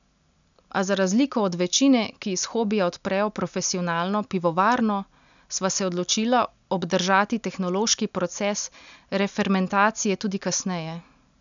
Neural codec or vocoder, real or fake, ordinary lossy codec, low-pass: none; real; none; 7.2 kHz